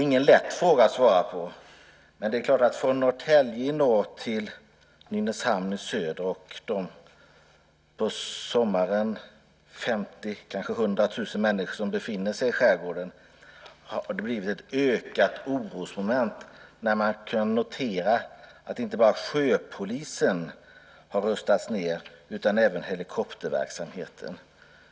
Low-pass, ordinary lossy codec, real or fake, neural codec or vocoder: none; none; real; none